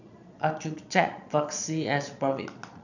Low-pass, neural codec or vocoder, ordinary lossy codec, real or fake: 7.2 kHz; vocoder, 22.05 kHz, 80 mel bands, Vocos; none; fake